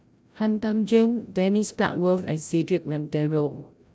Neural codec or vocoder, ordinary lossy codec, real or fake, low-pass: codec, 16 kHz, 0.5 kbps, FreqCodec, larger model; none; fake; none